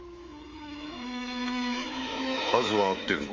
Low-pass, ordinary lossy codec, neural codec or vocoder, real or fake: 7.2 kHz; Opus, 32 kbps; codec, 24 kHz, 3.1 kbps, DualCodec; fake